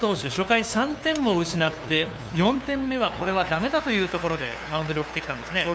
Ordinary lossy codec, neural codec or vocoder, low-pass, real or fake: none; codec, 16 kHz, 2 kbps, FunCodec, trained on LibriTTS, 25 frames a second; none; fake